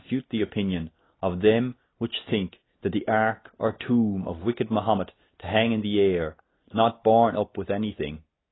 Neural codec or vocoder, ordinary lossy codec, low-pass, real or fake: none; AAC, 16 kbps; 7.2 kHz; real